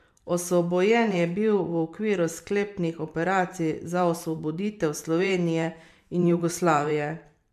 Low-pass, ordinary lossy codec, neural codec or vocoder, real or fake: 14.4 kHz; MP3, 96 kbps; vocoder, 44.1 kHz, 128 mel bands every 512 samples, BigVGAN v2; fake